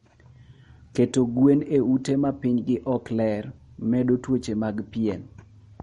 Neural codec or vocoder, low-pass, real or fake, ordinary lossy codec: none; 19.8 kHz; real; MP3, 48 kbps